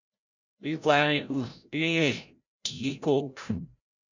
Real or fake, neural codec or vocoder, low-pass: fake; codec, 16 kHz, 0.5 kbps, FreqCodec, larger model; 7.2 kHz